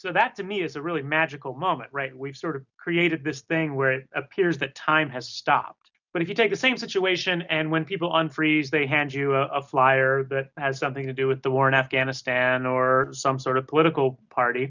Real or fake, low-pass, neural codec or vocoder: real; 7.2 kHz; none